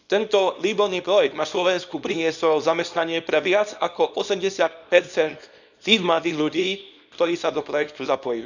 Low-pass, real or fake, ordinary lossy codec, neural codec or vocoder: 7.2 kHz; fake; none; codec, 24 kHz, 0.9 kbps, WavTokenizer, small release